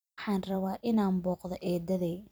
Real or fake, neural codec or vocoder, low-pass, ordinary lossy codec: fake; vocoder, 44.1 kHz, 128 mel bands every 256 samples, BigVGAN v2; none; none